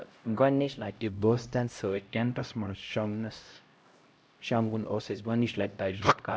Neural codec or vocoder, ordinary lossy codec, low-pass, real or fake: codec, 16 kHz, 0.5 kbps, X-Codec, HuBERT features, trained on LibriSpeech; none; none; fake